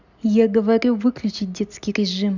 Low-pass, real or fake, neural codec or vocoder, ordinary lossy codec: 7.2 kHz; real; none; none